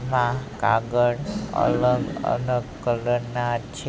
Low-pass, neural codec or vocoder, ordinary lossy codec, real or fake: none; none; none; real